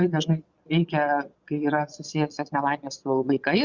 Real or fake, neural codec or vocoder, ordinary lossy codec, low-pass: fake; vocoder, 22.05 kHz, 80 mel bands, Vocos; Opus, 64 kbps; 7.2 kHz